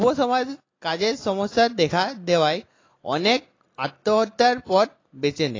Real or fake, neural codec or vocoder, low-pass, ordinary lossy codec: real; none; 7.2 kHz; AAC, 32 kbps